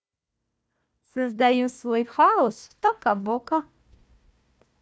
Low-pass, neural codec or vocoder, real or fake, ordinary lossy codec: none; codec, 16 kHz, 1 kbps, FunCodec, trained on Chinese and English, 50 frames a second; fake; none